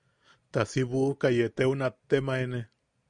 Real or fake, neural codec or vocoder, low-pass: real; none; 9.9 kHz